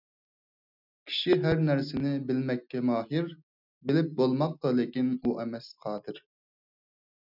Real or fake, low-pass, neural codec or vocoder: real; 5.4 kHz; none